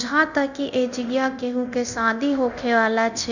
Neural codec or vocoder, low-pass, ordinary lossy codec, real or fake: codec, 24 kHz, 0.9 kbps, DualCodec; 7.2 kHz; none; fake